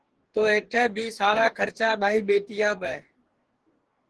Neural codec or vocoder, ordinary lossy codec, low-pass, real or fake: codec, 44.1 kHz, 2.6 kbps, DAC; Opus, 16 kbps; 10.8 kHz; fake